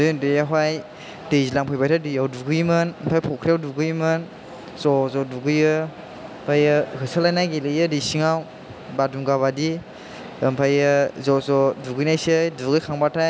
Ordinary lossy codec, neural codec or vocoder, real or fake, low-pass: none; none; real; none